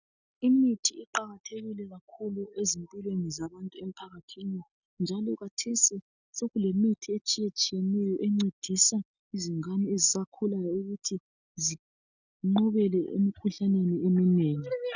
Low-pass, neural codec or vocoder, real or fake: 7.2 kHz; none; real